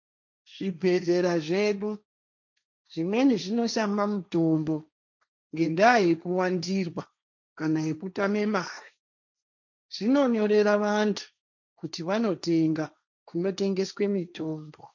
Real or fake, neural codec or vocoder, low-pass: fake; codec, 16 kHz, 1.1 kbps, Voila-Tokenizer; 7.2 kHz